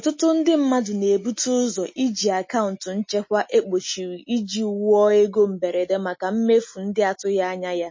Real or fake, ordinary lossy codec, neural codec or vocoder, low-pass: real; MP3, 32 kbps; none; 7.2 kHz